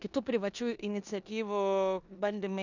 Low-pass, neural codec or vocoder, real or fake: 7.2 kHz; codec, 16 kHz in and 24 kHz out, 0.9 kbps, LongCat-Audio-Codec, four codebook decoder; fake